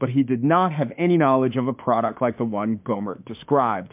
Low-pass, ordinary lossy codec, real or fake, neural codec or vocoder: 3.6 kHz; MP3, 32 kbps; fake; autoencoder, 48 kHz, 32 numbers a frame, DAC-VAE, trained on Japanese speech